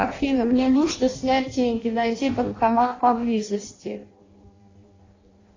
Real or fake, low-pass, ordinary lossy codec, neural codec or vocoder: fake; 7.2 kHz; AAC, 32 kbps; codec, 16 kHz in and 24 kHz out, 0.6 kbps, FireRedTTS-2 codec